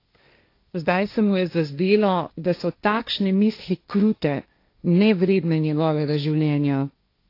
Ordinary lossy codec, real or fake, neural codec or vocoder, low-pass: AAC, 32 kbps; fake; codec, 16 kHz, 1.1 kbps, Voila-Tokenizer; 5.4 kHz